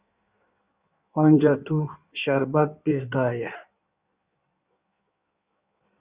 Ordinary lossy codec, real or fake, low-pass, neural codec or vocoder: Opus, 64 kbps; fake; 3.6 kHz; codec, 16 kHz in and 24 kHz out, 1.1 kbps, FireRedTTS-2 codec